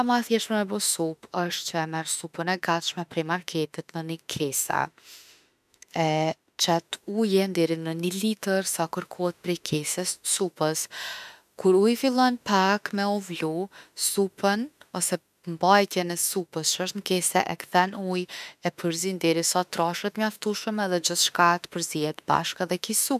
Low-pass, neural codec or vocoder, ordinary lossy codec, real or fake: 14.4 kHz; autoencoder, 48 kHz, 32 numbers a frame, DAC-VAE, trained on Japanese speech; none; fake